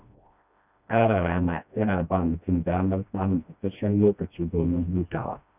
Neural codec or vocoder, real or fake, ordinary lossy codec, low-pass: codec, 16 kHz, 1 kbps, FreqCodec, smaller model; fake; none; 3.6 kHz